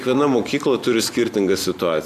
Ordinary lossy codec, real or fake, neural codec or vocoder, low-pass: AAC, 96 kbps; real; none; 14.4 kHz